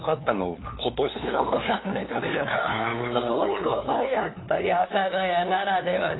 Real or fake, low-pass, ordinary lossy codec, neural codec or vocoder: fake; 7.2 kHz; AAC, 16 kbps; codec, 16 kHz, 2 kbps, FunCodec, trained on LibriTTS, 25 frames a second